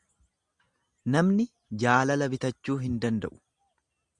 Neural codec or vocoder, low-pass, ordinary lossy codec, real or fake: none; 10.8 kHz; Opus, 64 kbps; real